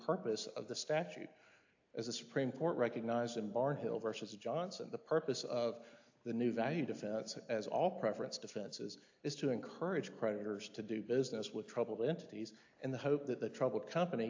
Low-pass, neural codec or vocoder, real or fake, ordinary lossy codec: 7.2 kHz; none; real; AAC, 48 kbps